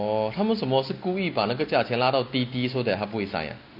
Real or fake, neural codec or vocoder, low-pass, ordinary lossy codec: real; none; 5.4 kHz; none